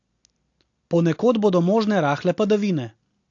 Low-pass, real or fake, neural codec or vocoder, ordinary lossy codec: 7.2 kHz; real; none; AAC, 48 kbps